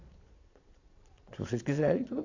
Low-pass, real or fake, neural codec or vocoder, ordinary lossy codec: 7.2 kHz; fake; vocoder, 22.05 kHz, 80 mel bands, Vocos; AAC, 48 kbps